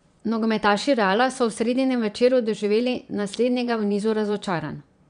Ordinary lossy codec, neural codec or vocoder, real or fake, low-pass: none; vocoder, 22.05 kHz, 80 mel bands, Vocos; fake; 9.9 kHz